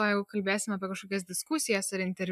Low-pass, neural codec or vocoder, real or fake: 14.4 kHz; none; real